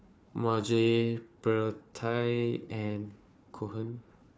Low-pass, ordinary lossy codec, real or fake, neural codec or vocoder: none; none; fake; codec, 16 kHz, 4 kbps, FunCodec, trained on Chinese and English, 50 frames a second